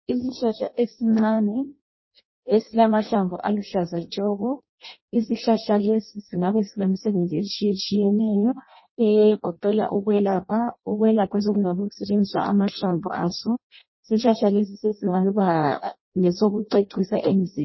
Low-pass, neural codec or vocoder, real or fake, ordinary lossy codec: 7.2 kHz; codec, 16 kHz in and 24 kHz out, 0.6 kbps, FireRedTTS-2 codec; fake; MP3, 24 kbps